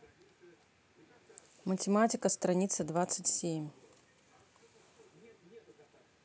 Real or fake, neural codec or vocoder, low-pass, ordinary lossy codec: real; none; none; none